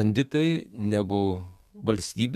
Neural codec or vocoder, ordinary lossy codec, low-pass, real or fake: codec, 32 kHz, 1.9 kbps, SNAC; MP3, 96 kbps; 14.4 kHz; fake